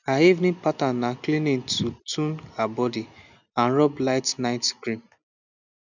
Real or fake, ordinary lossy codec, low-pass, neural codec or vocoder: real; none; 7.2 kHz; none